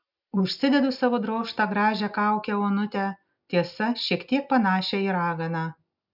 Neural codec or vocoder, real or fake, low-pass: none; real; 5.4 kHz